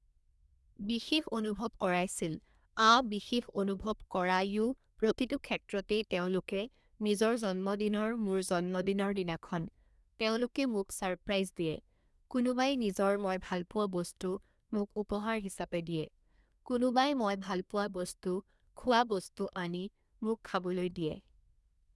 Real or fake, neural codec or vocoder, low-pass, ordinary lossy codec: fake; codec, 24 kHz, 1 kbps, SNAC; none; none